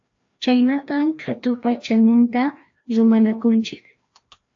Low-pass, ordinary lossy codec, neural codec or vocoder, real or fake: 7.2 kHz; AAC, 48 kbps; codec, 16 kHz, 1 kbps, FreqCodec, larger model; fake